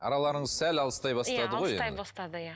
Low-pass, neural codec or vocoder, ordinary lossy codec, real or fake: none; none; none; real